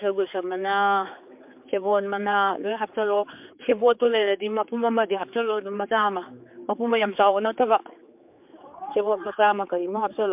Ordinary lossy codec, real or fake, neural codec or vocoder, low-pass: MP3, 32 kbps; fake; codec, 16 kHz, 4 kbps, X-Codec, HuBERT features, trained on general audio; 3.6 kHz